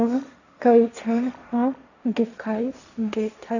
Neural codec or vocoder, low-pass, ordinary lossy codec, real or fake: codec, 16 kHz, 1.1 kbps, Voila-Tokenizer; 7.2 kHz; none; fake